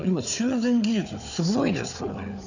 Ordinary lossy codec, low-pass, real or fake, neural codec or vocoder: none; 7.2 kHz; fake; codec, 16 kHz, 4 kbps, FunCodec, trained on Chinese and English, 50 frames a second